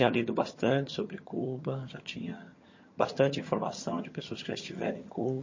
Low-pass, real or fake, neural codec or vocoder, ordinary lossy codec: 7.2 kHz; fake; vocoder, 22.05 kHz, 80 mel bands, HiFi-GAN; MP3, 32 kbps